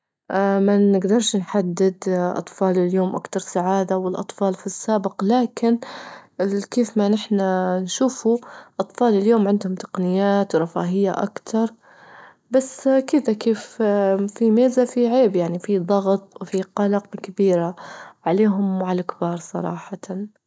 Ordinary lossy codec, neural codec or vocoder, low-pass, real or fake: none; none; none; real